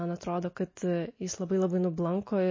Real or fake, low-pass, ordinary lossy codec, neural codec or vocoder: real; 7.2 kHz; MP3, 32 kbps; none